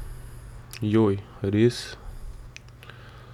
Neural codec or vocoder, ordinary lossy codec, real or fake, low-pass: none; none; real; 19.8 kHz